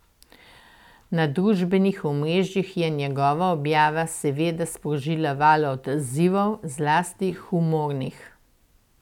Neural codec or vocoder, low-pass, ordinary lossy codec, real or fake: none; 19.8 kHz; none; real